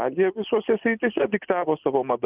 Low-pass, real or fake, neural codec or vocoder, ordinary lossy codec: 3.6 kHz; real; none; Opus, 24 kbps